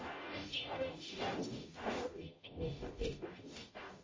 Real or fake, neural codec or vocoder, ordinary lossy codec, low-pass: fake; codec, 44.1 kHz, 0.9 kbps, DAC; MP3, 32 kbps; 7.2 kHz